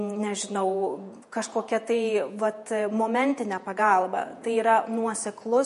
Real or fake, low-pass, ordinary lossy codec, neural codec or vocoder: fake; 14.4 kHz; MP3, 48 kbps; vocoder, 48 kHz, 128 mel bands, Vocos